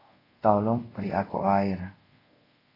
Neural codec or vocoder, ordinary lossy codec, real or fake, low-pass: codec, 24 kHz, 0.5 kbps, DualCodec; AAC, 24 kbps; fake; 5.4 kHz